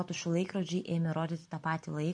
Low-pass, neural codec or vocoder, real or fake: 9.9 kHz; none; real